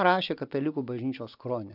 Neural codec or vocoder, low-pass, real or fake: none; 5.4 kHz; real